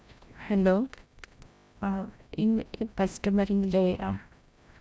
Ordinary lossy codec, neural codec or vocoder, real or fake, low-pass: none; codec, 16 kHz, 0.5 kbps, FreqCodec, larger model; fake; none